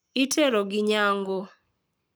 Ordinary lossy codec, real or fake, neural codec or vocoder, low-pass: none; fake; codec, 44.1 kHz, 7.8 kbps, Pupu-Codec; none